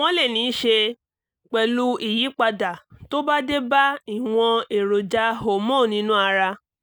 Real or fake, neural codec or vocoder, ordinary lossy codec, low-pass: real; none; none; none